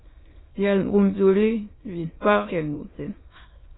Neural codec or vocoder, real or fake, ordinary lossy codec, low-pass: autoencoder, 22.05 kHz, a latent of 192 numbers a frame, VITS, trained on many speakers; fake; AAC, 16 kbps; 7.2 kHz